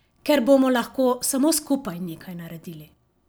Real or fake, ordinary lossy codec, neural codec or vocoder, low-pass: real; none; none; none